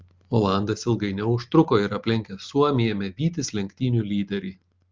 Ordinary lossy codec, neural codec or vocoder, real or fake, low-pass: Opus, 32 kbps; none; real; 7.2 kHz